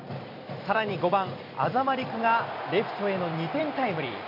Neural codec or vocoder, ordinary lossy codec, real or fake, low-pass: vocoder, 44.1 kHz, 128 mel bands every 256 samples, BigVGAN v2; MP3, 48 kbps; fake; 5.4 kHz